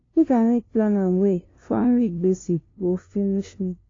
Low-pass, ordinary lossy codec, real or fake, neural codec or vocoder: 7.2 kHz; AAC, 32 kbps; fake; codec, 16 kHz, 0.5 kbps, FunCodec, trained on LibriTTS, 25 frames a second